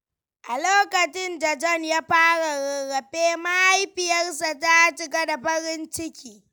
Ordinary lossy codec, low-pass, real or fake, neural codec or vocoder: none; none; real; none